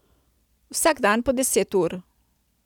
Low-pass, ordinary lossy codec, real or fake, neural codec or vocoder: none; none; real; none